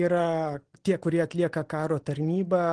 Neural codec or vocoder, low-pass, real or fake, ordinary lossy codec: none; 9.9 kHz; real; Opus, 16 kbps